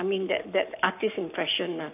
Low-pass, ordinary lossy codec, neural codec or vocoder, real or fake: 3.6 kHz; none; codec, 44.1 kHz, 7.8 kbps, Pupu-Codec; fake